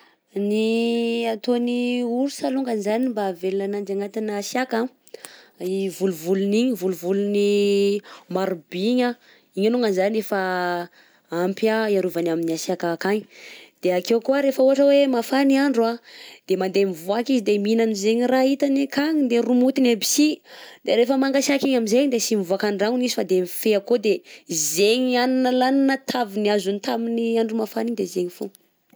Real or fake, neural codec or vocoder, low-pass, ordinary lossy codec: real; none; none; none